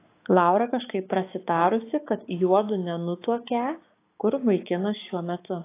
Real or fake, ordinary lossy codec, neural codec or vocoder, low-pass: fake; AAC, 24 kbps; codec, 16 kHz, 6 kbps, DAC; 3.6 kHz